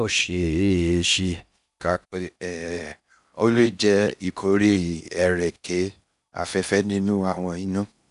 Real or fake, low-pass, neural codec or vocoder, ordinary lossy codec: fake; 10.8 kHz; codec, 16 kHz in and 24 kHz out, 0.6 kbps, FocalCodec, streaming, 4096 codes; none